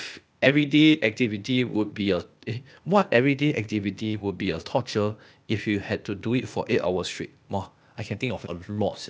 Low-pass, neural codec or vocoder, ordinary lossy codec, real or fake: none; codec, 16 kHz, 0.8 kbps, ZipCodec; none; fake